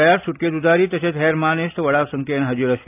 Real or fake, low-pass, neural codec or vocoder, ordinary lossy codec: real; 3.6 kHz; none; AAC, 32 kbps